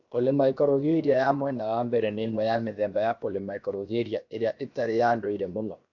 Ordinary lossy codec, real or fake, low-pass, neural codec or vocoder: AAC, 48 kbps; fake; 7.2 kHz; codec, 16 kHz, 0.7 kbps, FocalCodec